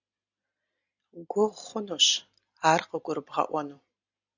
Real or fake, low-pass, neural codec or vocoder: real; 7.2 kHz; none